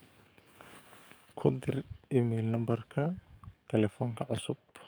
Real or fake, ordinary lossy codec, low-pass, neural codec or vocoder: fake; none; none; codec, 44.1 kHz, 7.8 kbps, DAC